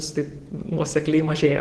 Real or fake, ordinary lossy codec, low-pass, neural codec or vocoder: fake; Opus, 16 kbps; 10.8 kHz; vocoder, 24 kHz, 100 mel bands, Vocos